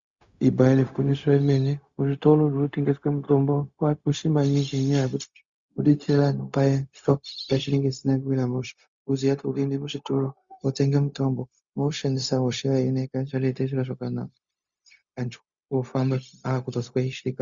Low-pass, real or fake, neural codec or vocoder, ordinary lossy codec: 7.2 kHz; fake; codec, 16 kHz, 0.4 kbps, LongCat-Audio-Codec; Opus, 64 kbps